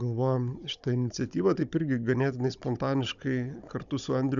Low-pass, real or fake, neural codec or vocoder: 7.2 kHz; fake; codec, 16 kHz, 16 kbps, FunCodec, trained on Chinese and English, 50 frames a second